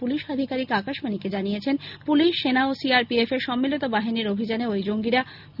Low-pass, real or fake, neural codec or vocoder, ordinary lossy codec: 5.4 kHz; real; none; none